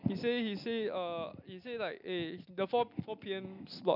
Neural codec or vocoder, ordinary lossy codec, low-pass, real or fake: none; none; 5.4 kHz; real